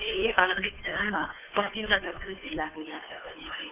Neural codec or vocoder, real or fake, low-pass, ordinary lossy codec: codec, 16 kHz, 2 kbps, FunCodec, trained on Chinese and English, 25 frames a second; fake; 3.6 kHz; none